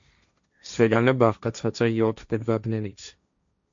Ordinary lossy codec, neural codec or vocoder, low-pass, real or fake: MP3, 64 kbps; codec, 16 kHz, 1.1 kbps, Voila-Tokenizer; 7.2 kHz; fake